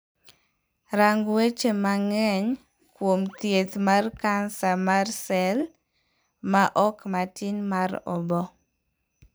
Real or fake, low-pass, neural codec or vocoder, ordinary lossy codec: fake; none; vocoder, 44.1 kHz, 128 mel bands every 256 samples, BigVGAN v2; none